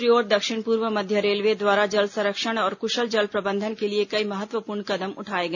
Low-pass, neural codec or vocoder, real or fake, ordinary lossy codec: 7.2 kHz; none; real; none